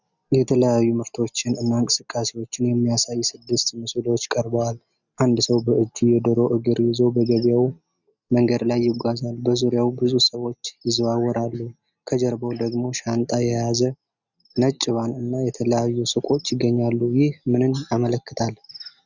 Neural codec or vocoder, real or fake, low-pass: none; real; 7.2 kHz